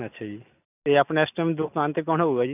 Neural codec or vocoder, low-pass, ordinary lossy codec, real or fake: none; 3.6 kHz; none; real